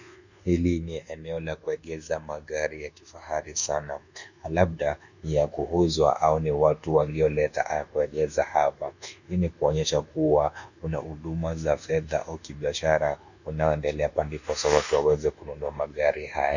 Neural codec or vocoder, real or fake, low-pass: codec, 24 kHz, 1.2 kbps, DualCodec; fake; 7.2 kHz